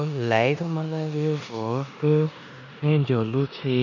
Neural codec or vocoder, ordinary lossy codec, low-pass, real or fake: codec, 16 kHz in and 24 kHz out, 0.9 kbps, LongCat-Audio-Codec, four codebook decoder; none; 7.2 kHz; fake